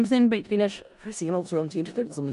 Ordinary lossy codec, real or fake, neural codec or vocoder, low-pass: none; fake; codec, 16 kHz in and 24 kHz out, 0.4 kbps, LongCat-Audio-Codec, four codebook decoder; 10.8 kHz